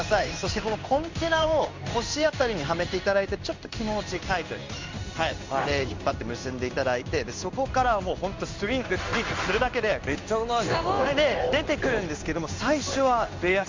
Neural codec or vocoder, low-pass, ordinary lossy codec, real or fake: codec, 16 kHz in and 24 kHz out, 1 kbps, XY-Tokenizer; 7.2 kHz; MP3, 64 kbps; fake